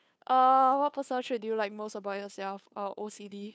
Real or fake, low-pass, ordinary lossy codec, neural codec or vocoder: fake; none; none; codec, 16 kHz, 2 kbps, FunCodec, trained on Chinese and English, 25 frames a second